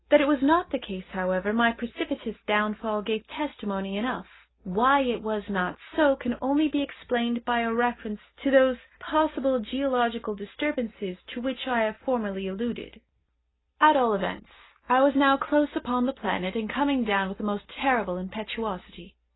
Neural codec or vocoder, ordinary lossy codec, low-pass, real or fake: none; AAC, 16 kbps; 7.2 kHz; real